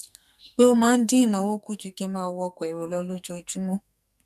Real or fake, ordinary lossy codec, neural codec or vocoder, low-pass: fake; none; codec, 44.1 kHz, 2.6 kbps, SNAC; 14.4 kHz